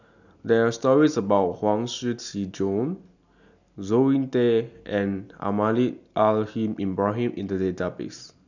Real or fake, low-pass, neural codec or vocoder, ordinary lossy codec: real; 7.2 kHz; none; none